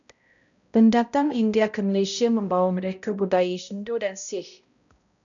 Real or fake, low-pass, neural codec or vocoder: fake; 7.2 kHz; codec, 16 kHz, 0.5 kbps, X-Codec, HuBERT features, trained on balanced general audio